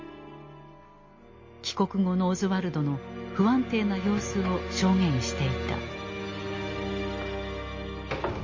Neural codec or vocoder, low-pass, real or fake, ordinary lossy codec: none; 7.2 kHz; real; MP3, 32 kbps